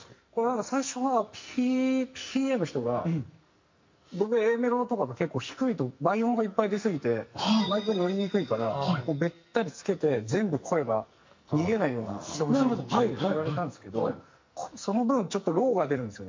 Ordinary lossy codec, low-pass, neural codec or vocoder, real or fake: MP3, 48 kbps; 7.2 kHz; codec, 44.1 kHz, 2.6 kbps, SNAC; fake